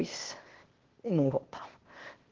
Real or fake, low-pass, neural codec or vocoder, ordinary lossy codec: fake; 7.2 kHz; codec, 16 kHz, 0.8 kbps, ZipCodec; Opus, 16 kbps